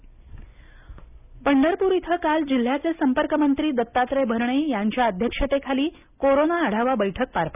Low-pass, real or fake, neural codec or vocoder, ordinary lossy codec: 3.6 kHz; real; none; none